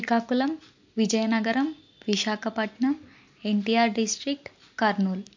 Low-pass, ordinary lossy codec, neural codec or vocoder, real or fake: 7.2 kHz; MP3, 48 kbps; none; real